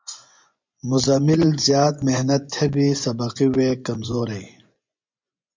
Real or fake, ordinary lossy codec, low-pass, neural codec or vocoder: fake; MP3, 64 kbps; 7.2 kHz; vocoder, 24 kHz, 100 mel bands, Vocos